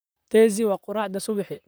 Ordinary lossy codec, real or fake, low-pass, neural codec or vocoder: none; fake; none; vocoder, 44.1 kHz, 128 mel bands, Pupu-Vocoder